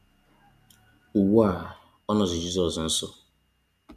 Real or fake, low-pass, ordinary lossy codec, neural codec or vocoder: real; 14.4 kHz; none; none